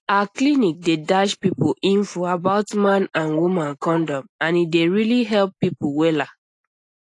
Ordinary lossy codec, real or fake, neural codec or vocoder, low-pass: AAC, 48 kbps; real; none; 10.8 kHz